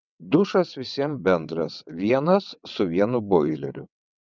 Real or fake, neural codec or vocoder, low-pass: fake; vocoder, 22.05 kHz, 80 mel bands, WaveNeXt; 7.2 kHz